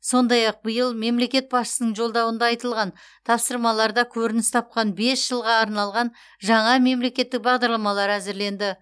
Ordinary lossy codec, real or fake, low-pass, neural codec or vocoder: none; real; none; none